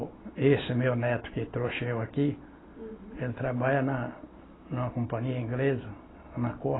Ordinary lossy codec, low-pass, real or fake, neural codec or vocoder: AAC, 16 kbps; 7.2 kHz; real; none